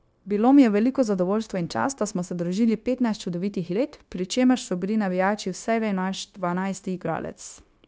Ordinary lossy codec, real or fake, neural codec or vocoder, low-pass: none; fake; codec, 16 kHz, 0.9 kbps, LongCat-Audio-Codec; none